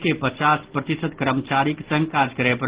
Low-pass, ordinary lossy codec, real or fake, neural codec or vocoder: 3.6 kHz; Opus, 16 kbps; real; none